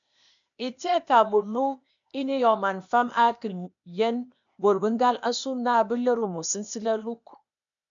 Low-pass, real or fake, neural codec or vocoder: 7.2 kHz; fake; codec, 16 kHz, 0.8 kbps, ZipCodec